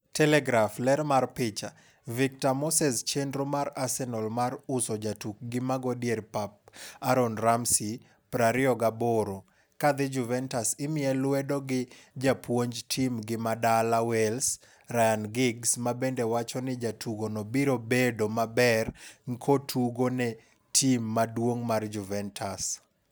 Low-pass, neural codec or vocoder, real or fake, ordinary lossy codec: none; none; real; none